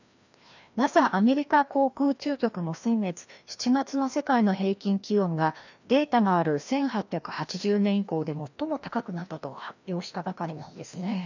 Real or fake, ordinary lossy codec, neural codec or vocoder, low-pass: fake; none; codec, 16 kHz, 1 kbps, FreqCodec, larger model; 7.2 kHz